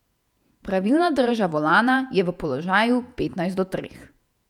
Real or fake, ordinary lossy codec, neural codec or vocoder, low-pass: fake; none; vocoder, 48 kHz, 128 mel bands, Vocos; 19.8 kHz